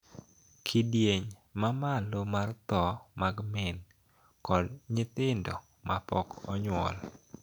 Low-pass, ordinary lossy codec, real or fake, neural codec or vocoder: 19.8 kHz; none; real; none